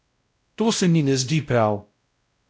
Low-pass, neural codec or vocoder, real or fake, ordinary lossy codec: none; codec, 16 kHz, 0.5 kbps, X-Codec, WavLM features, trained on Multilingual LibriSpeech; fake; none